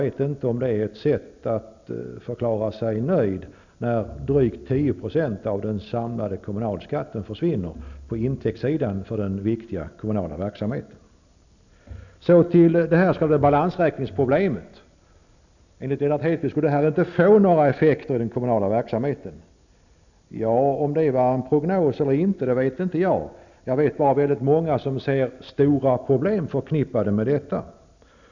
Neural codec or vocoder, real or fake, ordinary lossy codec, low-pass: none; real; none; 7.2 kHz